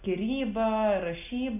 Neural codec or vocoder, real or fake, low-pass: none; real; 3.6 kHz